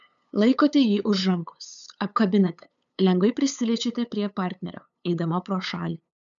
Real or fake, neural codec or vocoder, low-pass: fake; codec, 16 kHz, 8 kbps, FunCodec, trained on LibriTTS, 25 frames a second; 7.2 kHz